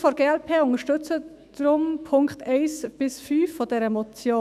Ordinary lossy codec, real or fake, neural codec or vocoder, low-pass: none; fake; autoencoder, 48 kHz, 128 numbers a frame, DAC-VAE, trained on Japanese speech; 14.4 kHz